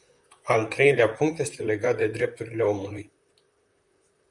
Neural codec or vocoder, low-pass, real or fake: vocoder, 44.1 kHz, 128 mel bands, Pupu-Vocoder; 10.8 kHz; fake